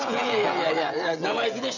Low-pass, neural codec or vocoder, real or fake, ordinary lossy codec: 7.2 kHz; codec, 16 kHz, 16 kbps, FreqCodec, smaller model; fake; none